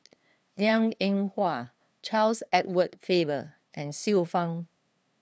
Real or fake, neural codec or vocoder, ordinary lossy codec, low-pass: fake; codec, 16 kHz, 2 kbps, FunCodec, trained on LibriTTS, 25 frames a second; none; none